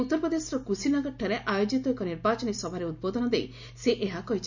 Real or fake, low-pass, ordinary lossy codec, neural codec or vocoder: real; 7.2 kHz; none; none